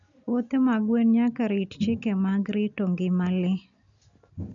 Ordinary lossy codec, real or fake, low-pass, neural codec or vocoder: MP3, 96 kbps; real; 7.2 kHz; none